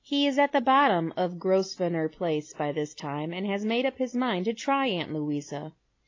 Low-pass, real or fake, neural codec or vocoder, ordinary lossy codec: 7.2 kHz; real; none; AAC, 32 kbps